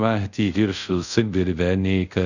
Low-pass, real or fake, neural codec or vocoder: 7.2 kHz; fake; codec, 24 kHz, 0.5 kbps, DualCodec